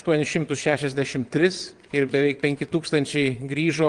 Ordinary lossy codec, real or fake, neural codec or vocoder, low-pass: Opus, 24 kbps; fake; vocoder, 22.05 kHz, 80 mel bands, WaveNeXt; 9.9 kHz